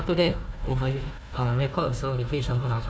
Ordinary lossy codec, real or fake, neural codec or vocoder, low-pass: none; fake; codec, 16 kHz, 1 kbps, FunCodec, trained on Chinese and English, 50 frames a second; none